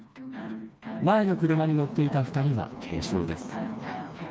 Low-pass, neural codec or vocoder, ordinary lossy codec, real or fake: none; codec, 16 kHz, 2 kbps, FreqCodec, smaller model; none; fake